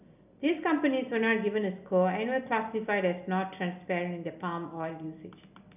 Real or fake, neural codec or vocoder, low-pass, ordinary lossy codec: real; none; 3.6 kHz; none